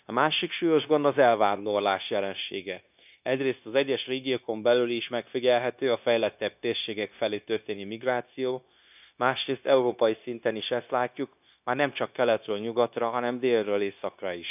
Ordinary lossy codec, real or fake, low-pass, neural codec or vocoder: none; fake; 3.6 kHz; codec, 16 kHz, 0.9 kbps, LongCat-Audio-Codec